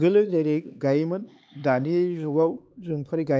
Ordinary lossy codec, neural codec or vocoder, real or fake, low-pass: none; codec, 16 kHz, 4 kbps, X-Codec, HuBERT features, trained on LibriSpeech; fake; none